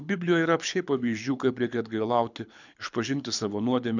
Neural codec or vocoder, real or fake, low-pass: codec, 24 kHz, 6 kbps, HILCodec; fake; 7.2 kHz